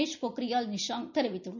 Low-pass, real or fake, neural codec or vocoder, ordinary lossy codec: 7.2 kHz; real; none; none